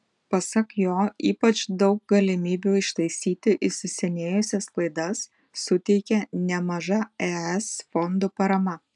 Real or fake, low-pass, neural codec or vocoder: real; 10.8 kHz; none